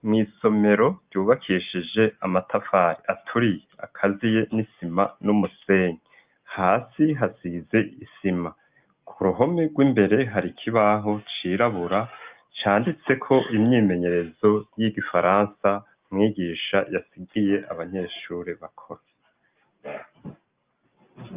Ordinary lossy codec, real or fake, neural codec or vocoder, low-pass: Opus, 24 kbps; real; none; 3.6 kHz